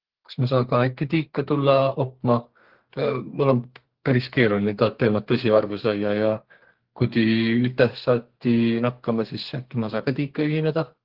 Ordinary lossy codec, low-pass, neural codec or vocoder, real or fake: Opus, 16 kbps; 5.4 kHz; codec, 32 kHz, 1.9 kbps, SNAC; fake